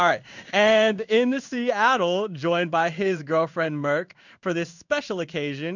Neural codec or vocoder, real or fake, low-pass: codec, 16 kHz in and 24 kHz out, 1 kbps, XY-Tokenizer; fake; 7.2 kHz